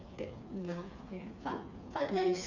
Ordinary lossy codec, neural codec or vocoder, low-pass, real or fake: none; codec, 16 kHz, 4 kbps, FreqCodec, smaller model; 7.2 kHz; fake